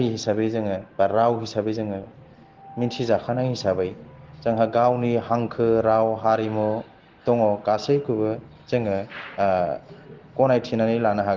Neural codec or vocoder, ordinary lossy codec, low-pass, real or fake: none; Opus, 16 kbps; 7.2 kHz; real